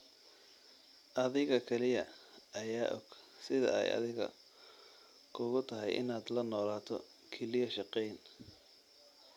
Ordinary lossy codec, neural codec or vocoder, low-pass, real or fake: none; none; 19.8 kHz; real